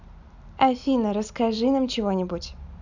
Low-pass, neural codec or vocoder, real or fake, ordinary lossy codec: 7.2 kHz; none; real; none